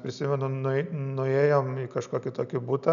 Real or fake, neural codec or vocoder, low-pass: real; none; 7.2 kHz